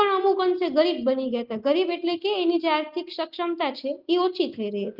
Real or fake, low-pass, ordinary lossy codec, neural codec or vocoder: real; 5.4 kHz; Opus, 32 kbps; none